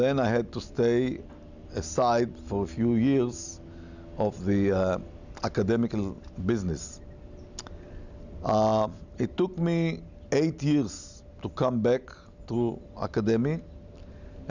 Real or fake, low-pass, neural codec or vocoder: real; 7.2 kHz; none